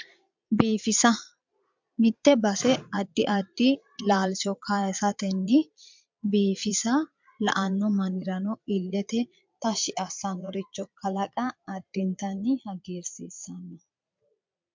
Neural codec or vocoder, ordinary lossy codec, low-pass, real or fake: vocoder, 22.05 kHz, 80 mel bands, Vocos; MP3, 64 kbps; 7.2 kHz; fake